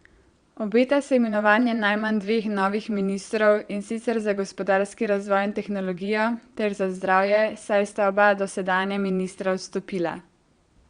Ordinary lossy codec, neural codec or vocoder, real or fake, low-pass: Opus, 64 kbps; vocoder, 22.05 kHz, 80 mel bands, Vocos; fake; 9.9 kHz